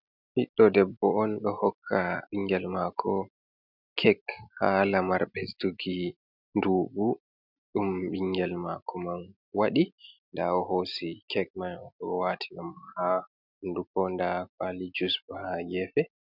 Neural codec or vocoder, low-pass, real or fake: none; 5.4 kHz; real